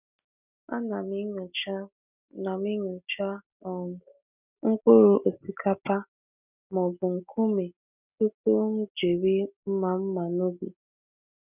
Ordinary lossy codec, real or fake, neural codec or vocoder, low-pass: none; real; none; 3.6 kHz